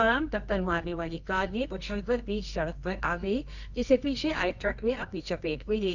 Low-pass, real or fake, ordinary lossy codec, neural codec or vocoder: 7.2 kHz; fake; none; codec, 24 kHz, 0.9 kbps, WavTokenizer, medium music audio release